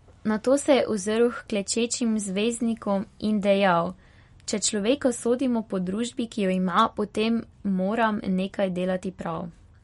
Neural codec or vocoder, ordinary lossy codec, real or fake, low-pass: none; MP3, 48 kbps; real; 19.8 kHz